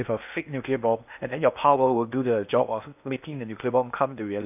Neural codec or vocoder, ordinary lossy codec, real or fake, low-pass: codec, 16 kHz in and 24 kHz out, 0.8 kbps, FocalCodec, streaming, 65536 codes; none; fake; 3.6 kHz